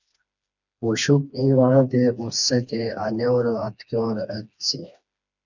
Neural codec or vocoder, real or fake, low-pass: codec, 16 kHz, 2 kbps, FreqCodec, smaller model; fake; 7.2 kHz